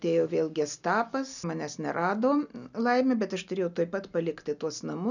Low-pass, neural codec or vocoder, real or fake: 7.2 kHz; none; real